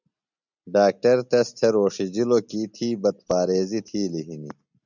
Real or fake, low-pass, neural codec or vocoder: real; 7.2 kHz; none